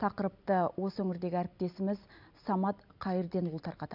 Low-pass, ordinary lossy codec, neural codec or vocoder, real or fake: 5.4 kHz; none; none; real